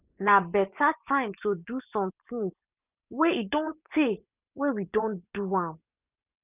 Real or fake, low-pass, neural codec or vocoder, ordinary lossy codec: fake; 3.6 kHz; vocoder, 24 kHz, 100 mel bands, Vocos; none